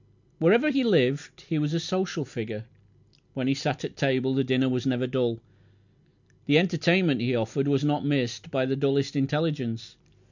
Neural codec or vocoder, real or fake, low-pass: none; real; 7.2 kHz